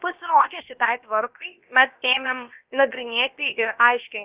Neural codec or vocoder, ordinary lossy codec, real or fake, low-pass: codec, 16 kHz, about 1 kbps, DyCAST, with the encoder's durations; Opus, 24 kbps; fake; 3.6 kHz